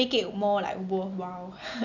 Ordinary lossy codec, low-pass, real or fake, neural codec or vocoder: none; 7.2 kHz; real; none